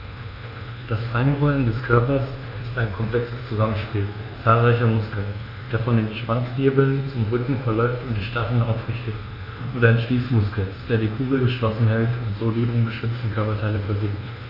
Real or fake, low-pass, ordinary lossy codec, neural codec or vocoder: fake; 5.4 kHz; none; codec, 24 kHz, 1.2 kbps, DualCodec